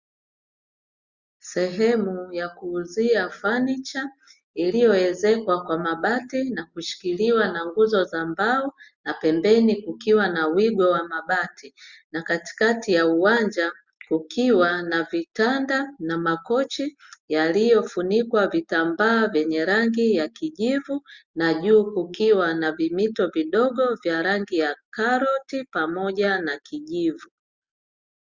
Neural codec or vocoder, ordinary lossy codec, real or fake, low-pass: none; Opus, 64 kbps; real; 7.2 kHz